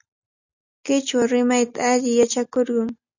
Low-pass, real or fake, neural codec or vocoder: 7.2 kHz; real; none